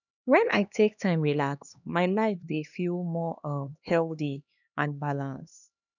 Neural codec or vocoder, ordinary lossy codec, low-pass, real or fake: codec, 16 kHz, 2 kbps, X-Codec, HuBERT features, trained on LibriSpeech; none; 7.2 kHz; fake